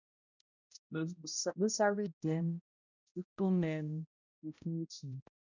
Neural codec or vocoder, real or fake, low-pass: codec, 16 kHz, 0.5 kbps, X-Codec, HuBERT features, trained on balanced general audio; fake; 7.2 kHz